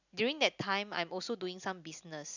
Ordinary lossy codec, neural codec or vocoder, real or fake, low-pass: none; none; real; 7.2 kHz